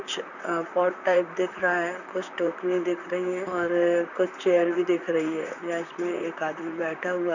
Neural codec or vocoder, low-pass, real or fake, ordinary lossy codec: vocoder, 44.1 kHz, 128 mel bands, Pupu-Vocoder; 7.2 kHz; fake; none